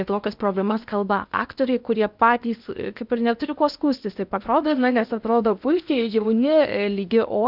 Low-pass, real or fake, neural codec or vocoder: 5.4 kHz; fake; codec, 16 kHz in and 24 kHz out, 0.8 kbps, FocalCodec, streaming, 65536 codes